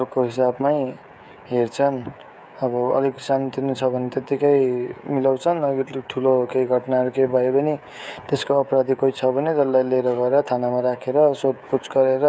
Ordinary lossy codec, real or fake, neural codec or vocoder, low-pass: none; fake; codec, 16 kHz, 16 kbps, FreqCodec, smaller model; none